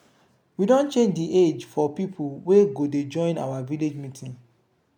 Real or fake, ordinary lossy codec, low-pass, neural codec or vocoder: real; none; 19.8 kHz; none